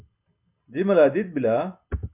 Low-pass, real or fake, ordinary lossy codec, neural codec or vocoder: 3.6 kHz; real; MP3, 32 kbps; none